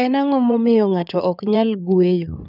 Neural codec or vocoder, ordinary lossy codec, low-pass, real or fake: codec, 16 kHz in and 24 kHz out, 2.2 kbps, FireRedTTS-2 codec; none; 5.4 kHz; fake